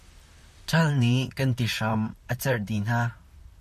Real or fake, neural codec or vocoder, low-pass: fake; vocoder, 44.1 kHz, 128 mel bands, Pupu-Vocoder; 14.4 kHz